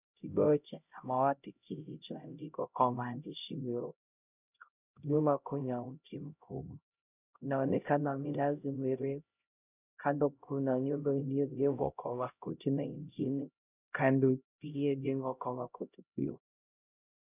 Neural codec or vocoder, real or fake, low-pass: codec, 16 kHz, 0.5 kbps, X-Codec, HuBERT features, trained on LibriSpeech; fake; 3.6 kHz